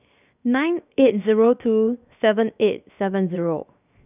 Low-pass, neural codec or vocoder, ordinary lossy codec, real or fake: 3.6 kHz; codec, 16 kHz, 0.7 kbps, FocalCodec; none; fake